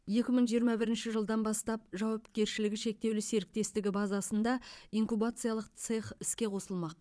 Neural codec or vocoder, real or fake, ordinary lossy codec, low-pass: vocoder, 22.05 kHz, 80 mel bands, Vocos; fake; none; none